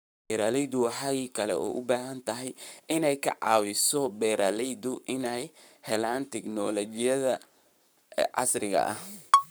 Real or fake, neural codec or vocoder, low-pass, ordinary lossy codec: fake; codec, 44.1 kHz, 7.8 kbps, Pupu-Codec; none; none